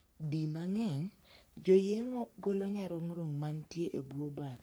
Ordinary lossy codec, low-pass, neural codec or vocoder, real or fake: none; none; codec, 44.1 kHz, 3.4 kbps, Pupu-Codec; fake